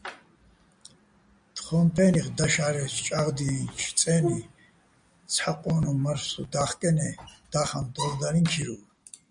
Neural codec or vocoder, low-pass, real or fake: none; 9.9 kHz; real